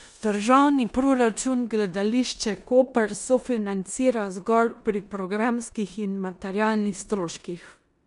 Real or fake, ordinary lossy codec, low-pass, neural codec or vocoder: fake; none; 10.8 kHz; codec, 16 kHz in and 24 kHz out, 0.9 kbps, LongCat-Audio-Codec, four codebook decoder